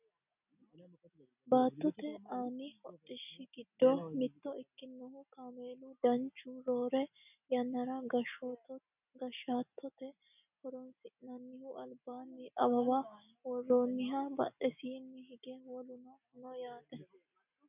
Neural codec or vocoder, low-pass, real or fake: none; 3.6 kHz; real